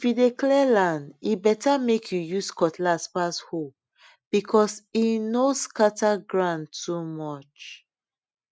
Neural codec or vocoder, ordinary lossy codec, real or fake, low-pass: none; none; real; none